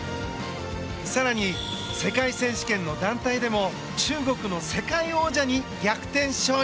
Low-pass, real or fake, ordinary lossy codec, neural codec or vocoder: none; real; none; none